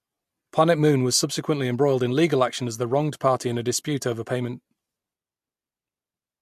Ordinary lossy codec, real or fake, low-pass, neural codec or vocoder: MP3, 64 kbps; real; 14.4 kHz; none